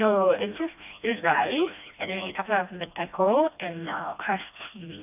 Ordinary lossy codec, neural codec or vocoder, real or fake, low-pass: none; codec, 16 kHz, 1 kbps, FreqCodec, smaller model; fake; 3.6 kHz